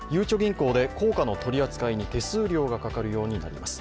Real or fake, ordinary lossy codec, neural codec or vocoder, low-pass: real; none; none; none